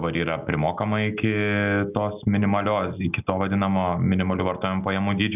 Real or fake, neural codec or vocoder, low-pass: real; none; 3.6 kHz